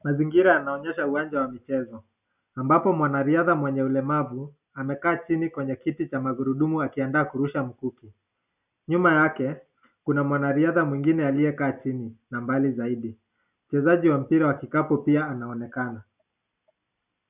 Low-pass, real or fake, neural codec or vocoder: 3.6 kHz; real; none